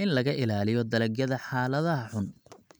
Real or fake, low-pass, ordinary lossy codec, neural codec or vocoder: real; none; none; none